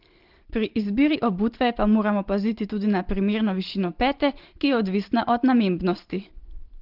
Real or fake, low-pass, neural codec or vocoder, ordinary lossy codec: real; 5.4 kHz; none; Opus, 16 kbps